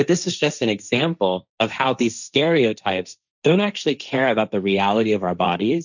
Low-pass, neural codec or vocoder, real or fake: 7.2 kHz; codec, 16 kHz, 1.1 kbps, Voila-Tokenizer; fake